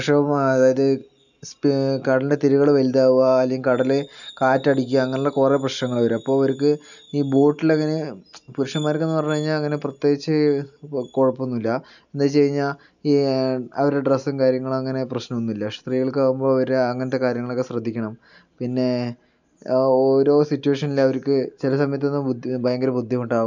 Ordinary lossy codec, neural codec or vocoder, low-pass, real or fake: none; none; 7.2 kHz; real